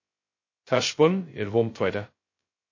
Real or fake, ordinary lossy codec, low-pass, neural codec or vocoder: fake; MP3, 32 kbps; 7.2 kHz; codec, 16 kHz, 0.2 kbps, FocalCodec